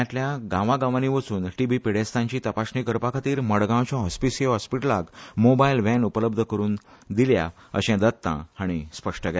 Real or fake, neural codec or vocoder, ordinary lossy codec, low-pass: real; none; none; none